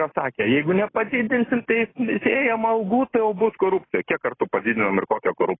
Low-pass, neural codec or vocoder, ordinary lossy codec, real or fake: 7.2 kHz; none; AAC, 16 kbps; real